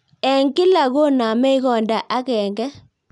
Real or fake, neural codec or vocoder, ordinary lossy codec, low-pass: real; none; none; 10.8 kHz